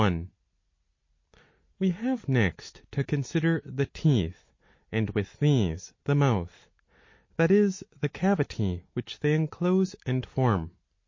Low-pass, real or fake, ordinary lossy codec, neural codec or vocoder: 7.2 kHz; real; MP3, 32 kbps; none